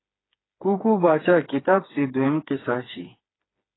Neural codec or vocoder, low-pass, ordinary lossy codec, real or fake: codec, 16 kHz, 4 kbps, FreqCodec, smaller model; 7.2 kHz; AAC, 16 kbps; fake